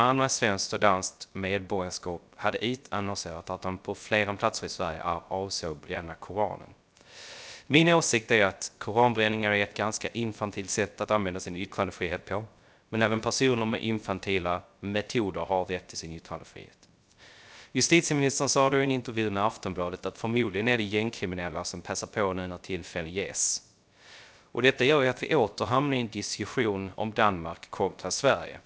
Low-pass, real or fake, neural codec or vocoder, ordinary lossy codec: none; fake; codec, 16 kHz, 0.3 kbps, FocalCodec; none